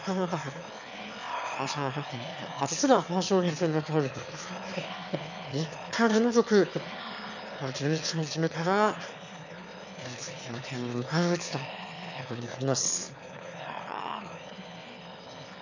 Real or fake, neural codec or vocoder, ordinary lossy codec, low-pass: fake; autoencoder, 22.05 kHz, a latent of 192 numbers a frame, VITS, trained on one speaker; none; 7.2 kHz